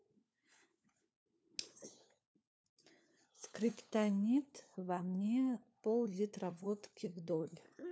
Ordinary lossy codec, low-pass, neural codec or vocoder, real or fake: none; none; codec, 16 kHz, 4 kbps, FunCodec, trained on LibriTTS, 50 frames a second; fake